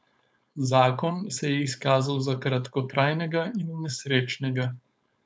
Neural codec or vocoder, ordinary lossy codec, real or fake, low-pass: codec, 16 kHz, 4.8 kbps, FACodec; none; fake; none